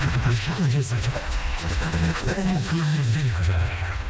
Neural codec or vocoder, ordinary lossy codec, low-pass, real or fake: codec, 16 kHz, 1 kbps, FreqCodec, smaller model; none; none; fake